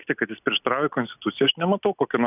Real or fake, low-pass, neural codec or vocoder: real; 3.6 kHz; none